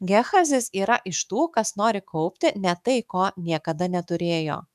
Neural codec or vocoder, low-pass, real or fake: autoencoder, 48 kHz, 128 numbers a frame, DAC-VAE, trained on Japanese speech; 14.4 kHz; fake